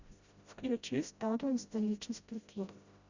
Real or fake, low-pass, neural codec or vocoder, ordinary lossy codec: fake; 7.2 kHz; codec, 16 kHz, 0.5 kbps, FreqCodec, smaller model; Opus, 64 kbps